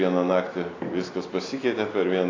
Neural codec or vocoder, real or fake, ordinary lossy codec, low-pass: none; real; AAC, 32 kbps; 7.2 kHz